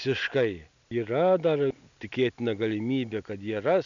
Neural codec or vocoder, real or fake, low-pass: none; real; 7.2 kHz